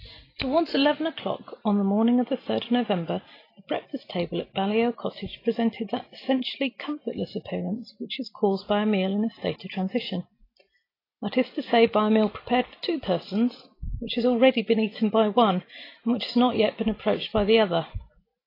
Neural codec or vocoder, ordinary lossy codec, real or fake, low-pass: none; AAC, 24 kbps; real; 5.4 kHz